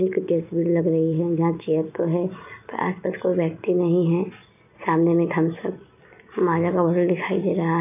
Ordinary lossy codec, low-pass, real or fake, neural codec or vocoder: none; 3.6 kHz; real; none